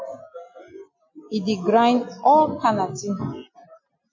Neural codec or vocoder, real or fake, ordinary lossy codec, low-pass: none; real; MP3, 48 kbps; 7.2 kHz